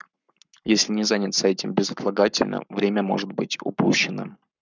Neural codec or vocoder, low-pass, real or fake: codec, 44.1 kHz, 7.8 kbps, Pupu-Codec; 7.2 kHz; fake